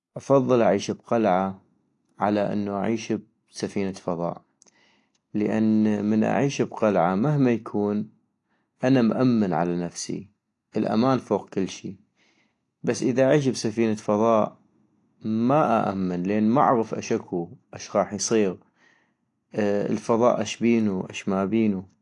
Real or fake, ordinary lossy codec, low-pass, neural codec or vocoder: real; AAC, 48 kbps; 10.8 kHz; none